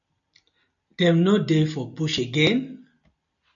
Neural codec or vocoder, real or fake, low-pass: none; real; 7.2 kHz